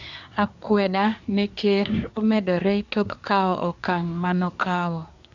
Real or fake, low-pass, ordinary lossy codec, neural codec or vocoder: fake; 7.2 kHz; none; codec, 24 kHz, 1 kbps, SNAC